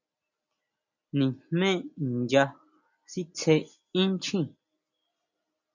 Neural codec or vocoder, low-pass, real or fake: none; 7.2 kHz; real